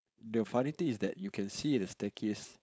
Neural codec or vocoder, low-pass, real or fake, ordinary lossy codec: codec, 16 kHz, 4.8 kbps, FACodec; none; fake; none